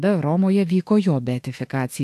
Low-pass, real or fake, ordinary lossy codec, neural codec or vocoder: 14.4 kHz; fake; AAC, 64 kbps; autoencoder, 48 kHz, 32 numbers a frame, DAC-VAE, trained on Japanese speech